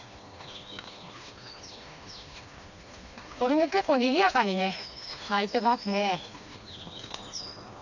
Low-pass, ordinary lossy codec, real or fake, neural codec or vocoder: 7.2 kHz; none; fake; codec, 16 kHz, 2 kbps, FreqCodec, smaller model